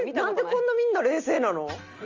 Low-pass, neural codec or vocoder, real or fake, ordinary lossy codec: 7.2 kHz; none; real; Opus, 32 kbps